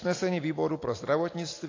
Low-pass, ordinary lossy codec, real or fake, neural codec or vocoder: 7.2 kHz; AAC, 32 kbps; real; none